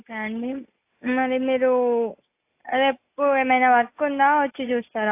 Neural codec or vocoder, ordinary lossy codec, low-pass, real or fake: none; AAC, 24 kbps; 3.6 kHz; real